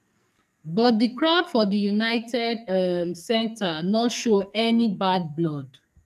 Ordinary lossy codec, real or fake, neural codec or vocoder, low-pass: none; fake; codec, 44.1 kHz, 2.6 kbps, SNAC; 14.4 kHz